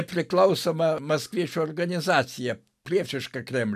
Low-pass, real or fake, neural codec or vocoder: 14.4 kHz; real; none